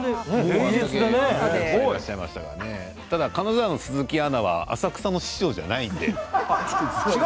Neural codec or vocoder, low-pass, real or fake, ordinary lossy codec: none; none; real; none